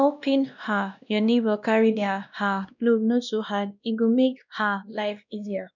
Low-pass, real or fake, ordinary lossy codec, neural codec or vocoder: 7.2 kHz; fake; none; codec, 16 kHz, 1 kbps, X-Codec, WavLM features, trained on Multilingual LibriSpeech